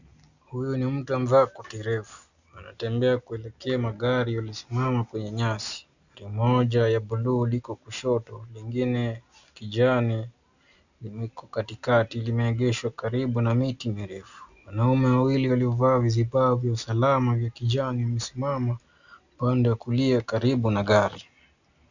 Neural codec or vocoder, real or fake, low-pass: none; real; 7.2 kHz